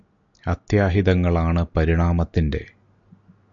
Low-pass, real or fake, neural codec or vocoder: 7.2 kHz; real; none